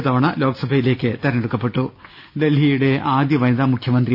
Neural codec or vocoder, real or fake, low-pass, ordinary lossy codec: none; real; 5.4 kHz; none